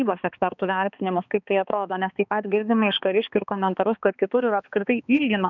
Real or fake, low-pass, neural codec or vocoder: fake; 7.2 kHz; codec, 16 kHz, 2 kbps, X-Codec, HuBERT features, trained on balanced general audio